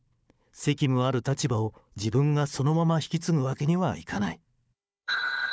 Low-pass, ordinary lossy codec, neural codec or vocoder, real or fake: none; none; codec, 16 kHz, 4 kbps, FunCodec, trained on Chinese and English, 50 frames a second; fake